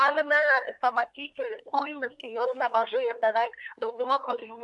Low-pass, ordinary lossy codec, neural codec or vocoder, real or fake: 10.8 kHz; MP3, 64 kbps; codec, 24 kHz, 1 kbps, SNAC; fake